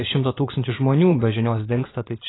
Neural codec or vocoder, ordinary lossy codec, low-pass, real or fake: vocoder, 24 kHz, 100 mel bands, Vocos; AAC, 16 kbps; 7.2 kHz; fake